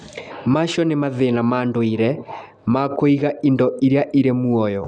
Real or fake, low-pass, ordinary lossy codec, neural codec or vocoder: real; none; none; none